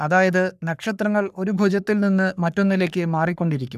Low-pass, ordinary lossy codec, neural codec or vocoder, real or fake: 14.4 kHz; none; codec, 44.1 kHz, 3.4 kbps, Pupu-Codec; fake